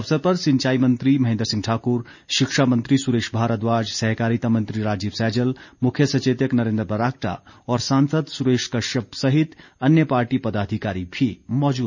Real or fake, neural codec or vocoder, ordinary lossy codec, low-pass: real; none; none; 7.2 kHz